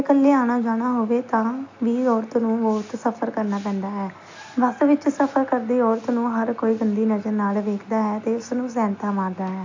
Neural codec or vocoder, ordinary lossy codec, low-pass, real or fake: none; AAC, 48 kbps; 7.2 kHz; real